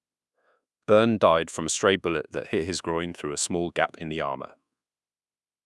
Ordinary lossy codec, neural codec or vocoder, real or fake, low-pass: none; codec, 24 kHz, 1.2 kbps, DualCodec; fake; none